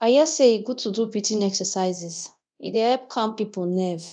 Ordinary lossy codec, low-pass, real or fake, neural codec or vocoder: none; 9.9 kHz; fake; codec, 24 kHz, 0.9 kbps, DualCodec